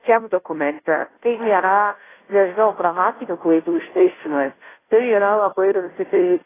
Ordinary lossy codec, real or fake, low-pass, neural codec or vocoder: AAC, 16 kbps; fake; 3.6 kHz; codec, 16 kHz, 0.5 kbps, FunCodec, trained on Chinese and English, 25 frames a second